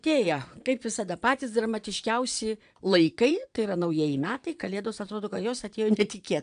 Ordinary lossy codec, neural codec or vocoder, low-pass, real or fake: AAC, 96 kbps; vocoder, 22.05 kHz, 80 mel bands, Vocos; 9.9 kHz; fake